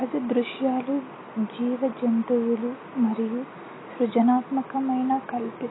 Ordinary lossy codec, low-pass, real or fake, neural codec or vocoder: AAC, 16 kbps; 7.2 kHz; real; none